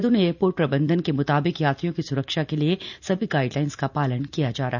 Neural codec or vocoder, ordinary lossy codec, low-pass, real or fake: none; none; 7.2 kHz; real